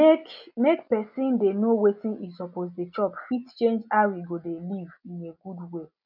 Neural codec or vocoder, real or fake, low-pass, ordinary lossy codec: none; real; 5.4 kHz; none